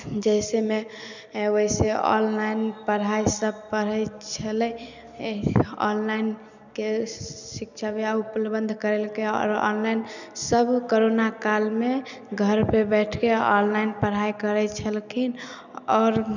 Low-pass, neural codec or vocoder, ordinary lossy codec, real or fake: 7.2 kHz; none; none; real